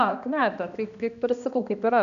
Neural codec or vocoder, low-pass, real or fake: codec, 16 kHz, 1 kbps, X-Codec, HuBERT features, trained on balanced general audio; 7.2 kHz; fake